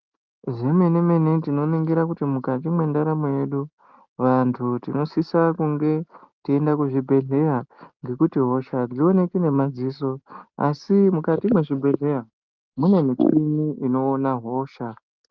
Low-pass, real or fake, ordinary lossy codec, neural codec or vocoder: 7.2 kHz; real; Opus, 24 kbps; none